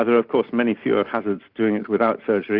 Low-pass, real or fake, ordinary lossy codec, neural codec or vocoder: 5.4 kHz; real; Opus, 64 kbps; none